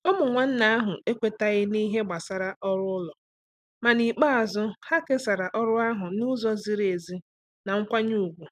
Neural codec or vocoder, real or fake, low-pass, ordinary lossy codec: none; real; 14.4 kHz; none